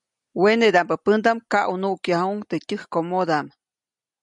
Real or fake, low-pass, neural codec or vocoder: real; 10.8 kHz; none